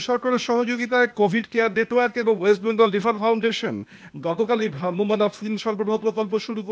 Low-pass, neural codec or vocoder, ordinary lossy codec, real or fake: none; codec, 16 kHz, 0.8 kbps, ZipCodec; none; fake